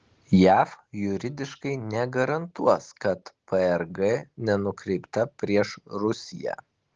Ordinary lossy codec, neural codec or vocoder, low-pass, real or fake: Opus, 16 kbps; none; 7.2 kHz; real